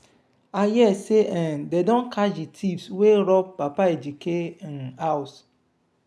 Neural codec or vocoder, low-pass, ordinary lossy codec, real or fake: none; none; none; real